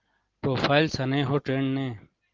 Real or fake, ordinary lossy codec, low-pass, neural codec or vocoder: real; Opus, 16 kbps; 7.2 kHz; none